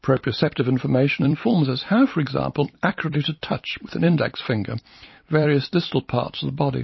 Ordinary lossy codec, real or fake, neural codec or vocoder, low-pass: MP3, 24 kbps; fake; vocoder, 44.1 kHz, 128 mel bands every 256 samples, BigVGAN v2; 7.2 kHz